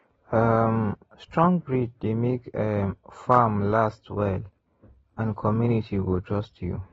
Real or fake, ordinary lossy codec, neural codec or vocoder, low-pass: real; AAC, 24 kbps; none; 19.8 kHz